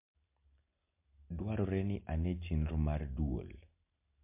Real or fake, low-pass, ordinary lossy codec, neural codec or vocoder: real; 3.6 kHz; MP3, 32 kbps; none